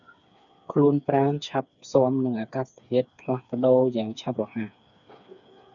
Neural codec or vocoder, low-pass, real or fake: codec, 16 kHz, 4 kbps, FreqCodec, smaller model; 7.2 kHz; fake